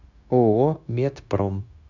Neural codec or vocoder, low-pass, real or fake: codec, 16 kHz, 0.9 kbps, LongCat-Audio-Codec; 7.2 kHz; fake